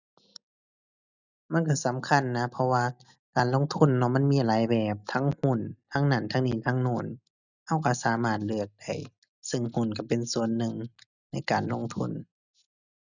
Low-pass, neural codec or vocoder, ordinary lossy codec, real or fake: 7.2 kHz; none; none; real